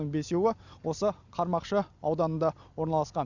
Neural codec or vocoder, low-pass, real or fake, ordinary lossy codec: none; 7.2 kHz; real; none